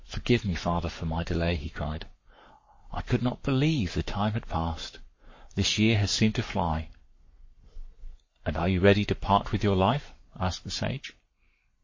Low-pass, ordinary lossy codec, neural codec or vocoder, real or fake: 7.2 kHz; MP3, 32 kbps; codec, 44.1 kHz, 7.8 kbps, Pupu-Codec; fake